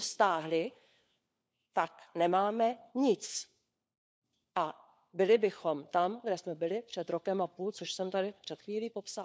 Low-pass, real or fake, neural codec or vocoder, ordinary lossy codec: none; fake; codec, 16 kHz, 4 kbps, FunCodec, trained on LibriTTS, 50 frames a second; none